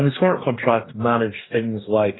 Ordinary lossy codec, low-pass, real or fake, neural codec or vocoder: AAC, 16 kbps; 7.2 kHz; fake; codec, 44.1 kHz, 2.6 kbps, DAC